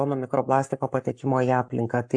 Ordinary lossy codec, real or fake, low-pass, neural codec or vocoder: AAC, 64 kbps; fake; 9.9 kHz; codec, 44.1 kHz, 7.8 kbps, Pupu-Codec